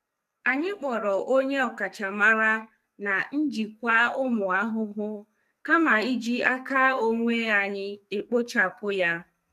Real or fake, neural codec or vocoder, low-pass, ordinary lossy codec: fake; codec, 44.1 kHz, 2.6 kbps, SNAC; 14.4 kHz; MP3, 96 kbps